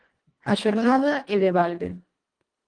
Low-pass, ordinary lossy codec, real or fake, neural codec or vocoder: 9.9 kHz; Opus, 24 kbps; fake; codec, 24 kHz, 1.5 kbps, HILCodec